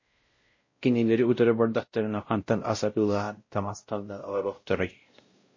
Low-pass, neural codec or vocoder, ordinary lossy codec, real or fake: 7.2 kHz; codec, 16 kHz, 0.5 kbps, X-Codec, WavLM features, trained on Multilingual LibriSpeech; MP3, 32 kbps; fake